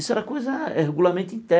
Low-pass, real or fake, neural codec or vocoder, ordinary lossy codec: none; real; none; none